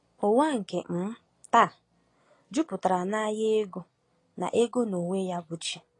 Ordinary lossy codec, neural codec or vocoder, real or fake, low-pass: AAC, 32 kbps; none; real; 10.8 kHz